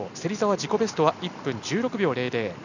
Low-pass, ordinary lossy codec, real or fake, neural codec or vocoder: 7.2 kHz; none; real; none